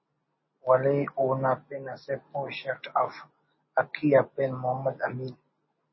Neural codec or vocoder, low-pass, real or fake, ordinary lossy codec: none; 7.2 kHz; real; MP3, 24 kbps